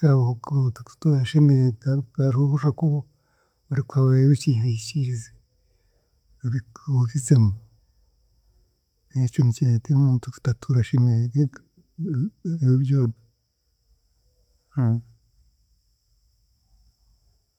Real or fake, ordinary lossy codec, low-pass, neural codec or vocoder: real; none; 19.8 kHz; none